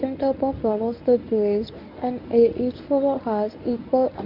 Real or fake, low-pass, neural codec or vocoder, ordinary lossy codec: fake; 5.4 kHz; codec, 24 kHz, 0.9 kbps, WavTokenizer, medium speech release version 1; none